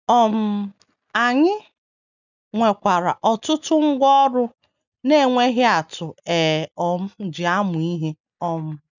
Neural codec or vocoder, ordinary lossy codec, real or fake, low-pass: none; none; real; 7.2 kHz